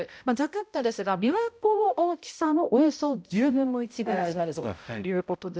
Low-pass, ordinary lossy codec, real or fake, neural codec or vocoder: none; none; fake; codec, 16 kHz, 0.5 kbps, X-Codec, HuBERT features, trained on balanced general audio